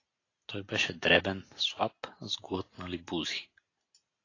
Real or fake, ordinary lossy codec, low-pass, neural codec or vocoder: real; AAC, 32 kbps; 7.2 kHz; none